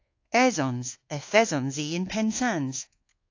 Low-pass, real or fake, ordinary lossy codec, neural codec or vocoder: 7.2 kHz; fake; AAC, 48 kbps; codec, 24 kHz, 1.2 kbps, DualCodec